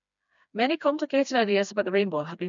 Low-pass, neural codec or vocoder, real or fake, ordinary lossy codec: 7.2 kHz; codec, 16 kHz, 2 kbps, FreqCodec, smaller model; fake; none